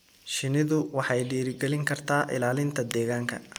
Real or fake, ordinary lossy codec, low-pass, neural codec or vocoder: real; none; none; none